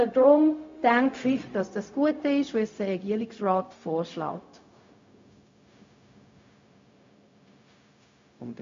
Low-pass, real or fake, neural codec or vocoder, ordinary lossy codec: 7.2 kHz; fake; codec, 16 kHz, 0.4 kbps, LongCat-Audio-Codec; MP3, 48 kbps